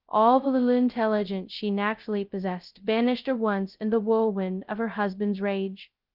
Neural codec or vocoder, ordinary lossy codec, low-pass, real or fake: codec, 16 kHz, 0.2 kbps, FocalCodec; Opus, 32 kbps; 5.4 kHz; fake